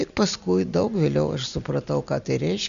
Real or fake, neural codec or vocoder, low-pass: real; none; 7.2 kHz